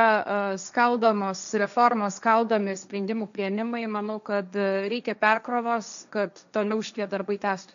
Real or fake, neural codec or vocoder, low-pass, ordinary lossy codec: fake; codec, 16 kHz, 1.1 kbps, Voila-Tokenizer; 7.2 kHz; MP3, 96 kbps